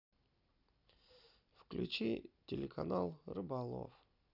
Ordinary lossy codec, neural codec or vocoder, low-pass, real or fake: none; none; 5.4 kHz; real